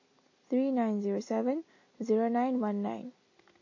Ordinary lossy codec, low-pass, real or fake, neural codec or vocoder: MP3, 32 kbps; 7.2 kHz; real; none